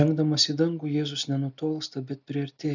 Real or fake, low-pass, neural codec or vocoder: real; 7.2 kHz; none